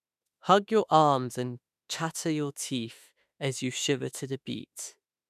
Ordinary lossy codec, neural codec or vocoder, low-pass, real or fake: none; autoencoder, 48 kHz, 32 numbers a frame, DAC-VAE, trained on Japanese speech; 14.4 kHz; fake